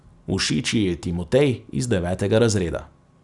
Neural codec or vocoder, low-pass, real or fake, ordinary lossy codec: none; 10.8 kHz; real; none